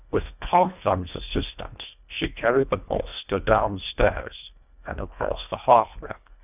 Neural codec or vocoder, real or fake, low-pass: codec, 24 kHz, 1.5 kbps, HILCodec; fake; 3.6 kHz